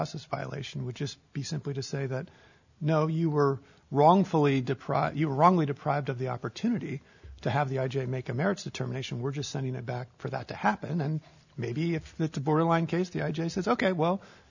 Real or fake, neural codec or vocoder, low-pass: real; none; 7.2 kHz